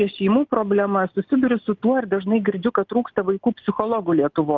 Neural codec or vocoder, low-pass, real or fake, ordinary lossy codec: none; 7.2 kHz; real; Opus, 24 kbps